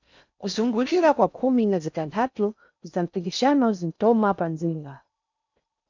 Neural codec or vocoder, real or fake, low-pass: codec, 16 kHz in and 24 kHz out, 0.6 kbps, FocalCodec, streaming, 4096 codes; fake; 7.2 kHz